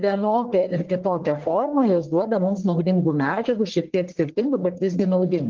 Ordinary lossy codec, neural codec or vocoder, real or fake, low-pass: Opus, 16 kbps; codec, 44.1 kHz, 1.7 kbps, Pupu-Codec; fake; 7.2 kHz